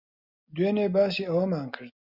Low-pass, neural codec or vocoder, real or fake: 5.4 kHz; none; real